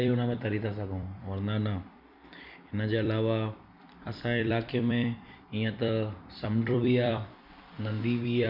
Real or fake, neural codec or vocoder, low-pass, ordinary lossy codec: fake; vocoder, 44.1 kHz, 128 mel bands every 256 samples, BigVGAN v2; 5.4 kHz; none